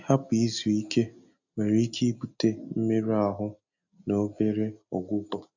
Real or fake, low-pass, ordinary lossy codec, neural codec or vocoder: real; 7.2 kHz; none; none